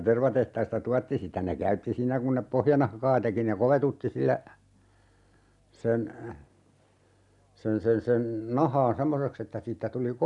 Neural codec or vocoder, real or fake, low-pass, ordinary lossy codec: none; real; 10.8 kHz; none